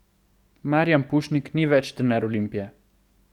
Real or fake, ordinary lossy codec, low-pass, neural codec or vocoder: fake; Opus, 64 kbps; 19.8 kHz; codec, 44.1 kHz, 7.8 kbps, DAC